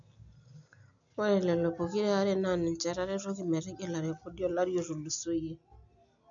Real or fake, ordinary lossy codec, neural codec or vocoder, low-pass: real; none; none; 7.2 kHz